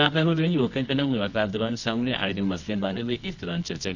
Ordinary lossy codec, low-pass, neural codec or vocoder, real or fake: none; 7.2 kHz; codec, 24 kHz, 0.9 kbps, WavTokenizer, medium music audio release; fake